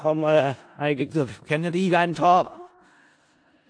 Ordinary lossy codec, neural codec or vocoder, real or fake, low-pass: AAC, 48 kbps; codec, 16 kHz in and 24 kHz out, 0.4 kbps, LongCat-Audio-Codec, four codebook decoder; fake; 9.9 kHz